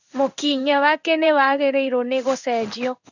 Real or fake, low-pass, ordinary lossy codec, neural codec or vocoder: fake; 7.2 kHz; none; codec, 16 kHz in and 24 kHz out, 1 kbps, XY-Tokenizer